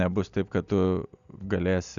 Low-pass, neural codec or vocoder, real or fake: 7.2 kHz; none; real